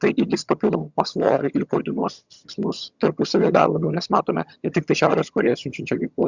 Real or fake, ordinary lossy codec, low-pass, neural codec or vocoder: fake; Opus, 64 kbps; 7.2 kHz; vocoder, 22.05 kHz, 80 mel bands, HiFi-GAN